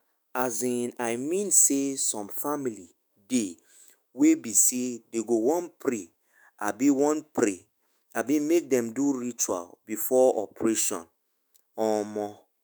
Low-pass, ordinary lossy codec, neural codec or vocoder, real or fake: none; none; autoencoder, 48 kHz, 128 numbers a frame, DAC-VAE, trained on Japanese speech; fake